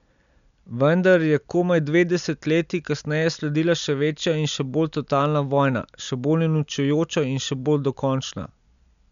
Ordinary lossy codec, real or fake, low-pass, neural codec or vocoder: none; real; 7.2 kHz; none